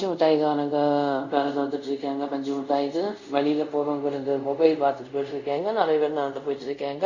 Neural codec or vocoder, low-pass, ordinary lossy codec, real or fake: codec, 24 kHz, 0.5 kbps, DualCodec; 7.2 kHz; Opus, 64 kbps; fake